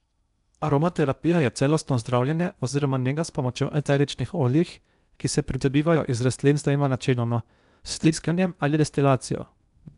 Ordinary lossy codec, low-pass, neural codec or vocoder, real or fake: none; 10.8 kHz; codec, 16 kHz in and 24 kHz out, 0.8 kbps, FocalCodec, streaming, 65536 codes; fake